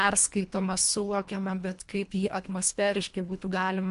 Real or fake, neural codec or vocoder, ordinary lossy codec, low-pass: fake; codec, 24 kHz, 1.5 kbps, HILCodec; MP3, 64 kbps; 10.8 kHz